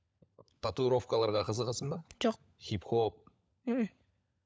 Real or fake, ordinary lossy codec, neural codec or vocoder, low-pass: fake; none; codec, 16 kHz, 16 kbps, FunCodec, trained on LibriTTS, 50 frames a second; none